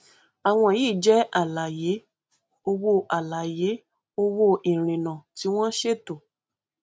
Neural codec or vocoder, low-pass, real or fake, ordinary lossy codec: none; none; real; none